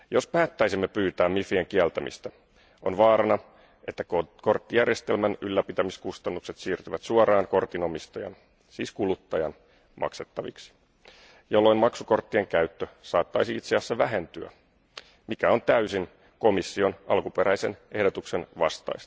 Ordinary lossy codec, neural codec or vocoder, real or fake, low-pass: none; none; real; none